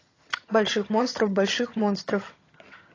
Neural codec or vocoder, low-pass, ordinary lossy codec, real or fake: vocoder, 22.05 kHz, 80 mel bands, HiFi-GAN; 7.2 kHz; AAC, 32 kbps; fake